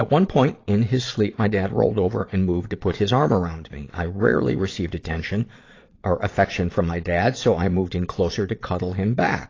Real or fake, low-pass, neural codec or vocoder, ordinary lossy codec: fake; 7.2 kHz; vocoder, 22.05 kHz, 80 mel bands, Vocos; AAC, 32 kbps